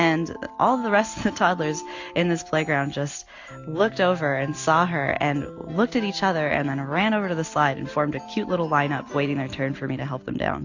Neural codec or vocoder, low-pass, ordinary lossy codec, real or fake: none; 7.2 kHz; AAC, 48 kbps; real